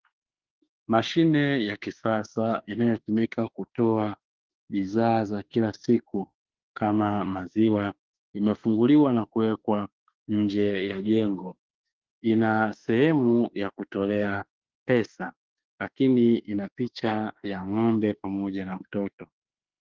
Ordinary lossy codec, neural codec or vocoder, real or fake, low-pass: Opus, 16 kbps; autoencoder, 48 kHz, 32 numbers a frame, DAC-VAE, trained on Japanese speech; fake; 7.2 kHz